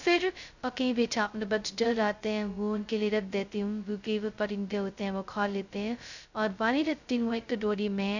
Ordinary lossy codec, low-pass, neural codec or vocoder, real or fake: none; 7.2 kHz; codec, 16 kHz, 0.2 kbps, FocalCodec; fake